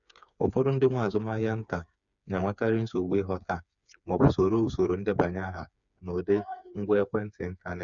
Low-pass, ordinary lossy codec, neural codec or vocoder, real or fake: 7.2 kHz; none; codec, 16 kHz, 4 kbps, FreqCodec, smaller model; fake